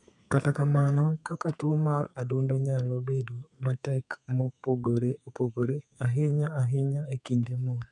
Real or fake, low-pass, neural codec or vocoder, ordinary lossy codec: fake; 10.8 kHz; codec, 32 kHz, 1.9 kbps, SNAC; none